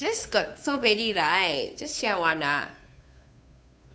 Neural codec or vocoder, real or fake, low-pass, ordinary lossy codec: codec, 16 kHz, 2 kbps, FunCodec, trained on Chinese and English, 25 frames a second; fake; none; none